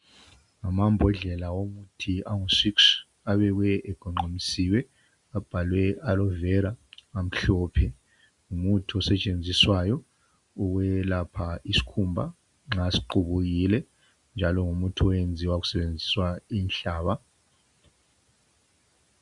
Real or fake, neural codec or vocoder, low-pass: real; none; 10.8 kHz